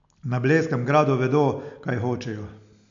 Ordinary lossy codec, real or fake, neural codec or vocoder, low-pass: AAC, 64 kbps; real; none; 7.2 kHz